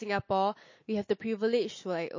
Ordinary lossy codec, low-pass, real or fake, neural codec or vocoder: MP3, 32 kbps; 7.2 kHz; real; none